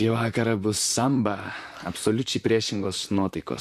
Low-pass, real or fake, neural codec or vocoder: 14.4 kHz; fake; vocoder, 44.1 kHz, 128 mel bands, Pupu-Vocoder